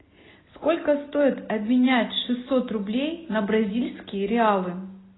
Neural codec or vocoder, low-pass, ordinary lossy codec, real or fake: none; 7.2 kHz; AAC, 16 kbps; real